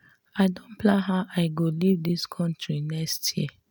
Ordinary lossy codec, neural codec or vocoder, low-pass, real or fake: none; none; none; real